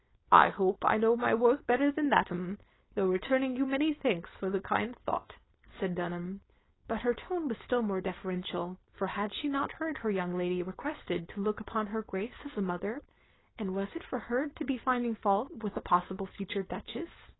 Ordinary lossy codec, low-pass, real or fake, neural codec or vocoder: AAC, 16 kbps; 7.2 kHz; fake; codec, 16 kHz, 4.8 kbps, FACodec